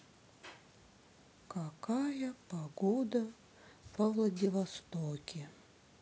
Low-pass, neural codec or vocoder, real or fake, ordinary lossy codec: none; none; real; none